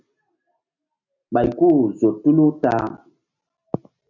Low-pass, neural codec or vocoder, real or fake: 7.2 kHz; none; real